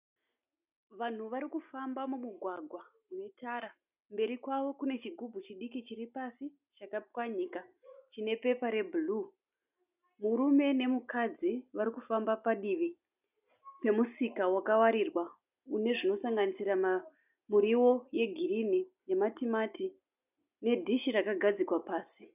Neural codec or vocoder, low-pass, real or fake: none; 3.6 kHz; real